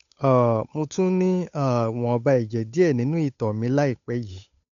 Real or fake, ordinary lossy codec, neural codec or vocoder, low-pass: fake; none; codec, 16 kHz, 8 kbps, FunCodec, trained on Chinese and English, 25 frames a second; 7.2 kHz